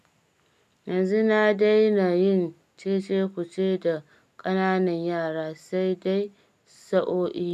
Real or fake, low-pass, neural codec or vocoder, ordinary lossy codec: real; 14.4 kHz; none; none